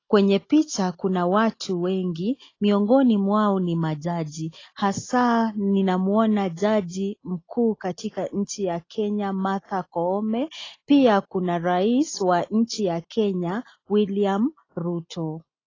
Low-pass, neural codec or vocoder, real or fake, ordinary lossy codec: 7.2 kHz; none; real; AAC, 32 kbps